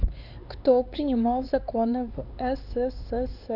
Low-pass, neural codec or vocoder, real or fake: 5.4 kHz; codec, 16 kHz, 4 kbps, X-Codec, HuBERT features, trained on LibriSpeech; fake